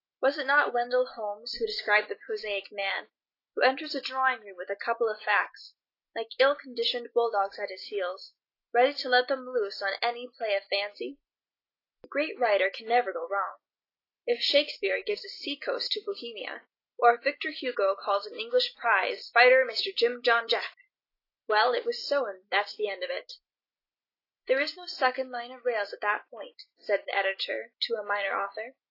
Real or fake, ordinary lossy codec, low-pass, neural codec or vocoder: real; AAC, 32 kbps; 5.4 kHz; none